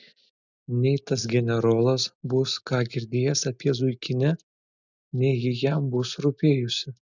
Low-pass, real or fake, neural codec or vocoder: 7.2 kHz; real; none